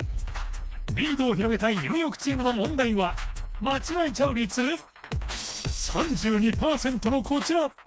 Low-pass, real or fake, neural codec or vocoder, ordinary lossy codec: none; fake; codec, 16 kHz, 2 kbps, FreqCodec, smaller model; none